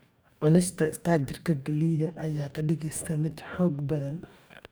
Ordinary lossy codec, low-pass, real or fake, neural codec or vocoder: none; none; fake; codec, 44.1 kHz, 2.6 kbps, DAC